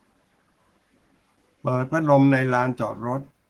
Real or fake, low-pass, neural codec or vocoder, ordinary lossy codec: fake; 19.8 kHz; codec, 44.1 kHz, 7.8 kbps, Pupu-Codec; Opus, 16 kbps